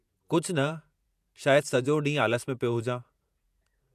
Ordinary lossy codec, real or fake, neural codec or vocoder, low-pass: none; fake; vocoder, 48 kHz, 128 mel bands, Vocos; 14.4 kHz